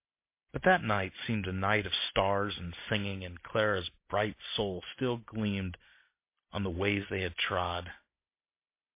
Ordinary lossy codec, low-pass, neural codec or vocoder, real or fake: MP3, 24 kbps; 3.6 kHz; none; real